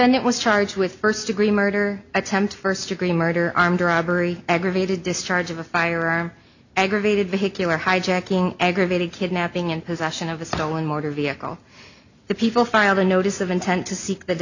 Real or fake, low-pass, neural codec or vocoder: real; 7.2 kHz; none